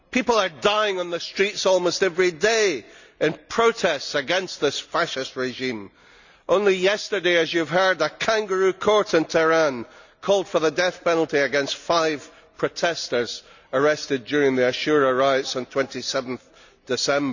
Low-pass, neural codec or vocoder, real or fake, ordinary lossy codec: 7.2 kHz; none; real; none